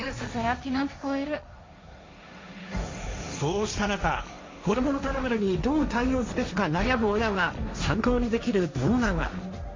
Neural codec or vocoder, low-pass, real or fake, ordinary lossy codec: codec, 16 kHz, 1.1 kbps, Voila-Tokenizer; 7.2 kHz; fake; MP3, 48 kbps